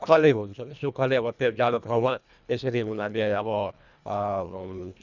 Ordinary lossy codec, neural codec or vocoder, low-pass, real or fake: none; codec, 24 kHz, 1.5 kbps, HILCodec; 7.2 kHz; fake